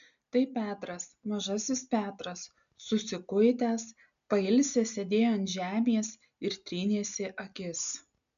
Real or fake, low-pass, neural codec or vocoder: real; 7.2 kHz; none